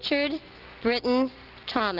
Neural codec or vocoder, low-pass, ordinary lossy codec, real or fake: none; 5.4 kHz; Opus, 16 kbps; real